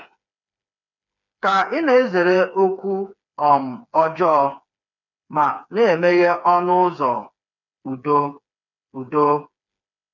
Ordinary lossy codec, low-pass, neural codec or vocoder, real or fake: none; 7.2 kHz; codec, 16 kHz, 4 kbps, FreqCodec, smaller model; fake